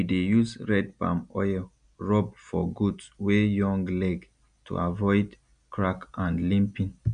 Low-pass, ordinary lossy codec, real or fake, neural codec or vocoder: 9.9 kHz; none; real; none